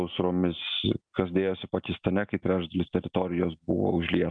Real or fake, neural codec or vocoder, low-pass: real; none; 9.9 kHz